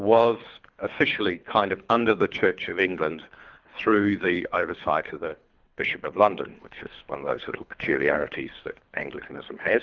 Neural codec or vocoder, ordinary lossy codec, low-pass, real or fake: codec, 24 kHz, 3 kbps, HILCodec; Opus, 24 kbps; 7.2 kHz; fake